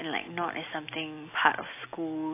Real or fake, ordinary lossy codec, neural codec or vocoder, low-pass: real; AAC, 24 kbps; none; 3.6 kHz